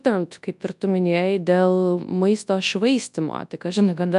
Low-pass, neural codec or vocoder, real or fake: 10.8 kHz; codec, 24 kHz, 0.9 kbps, WavTokenizer, large speech release; fake